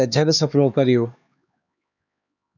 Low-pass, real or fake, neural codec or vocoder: 7.2 kHz; fake; codec, 16 kHz, 2 kbps, X-Codec, HuBERT features, trained on LibriSpeech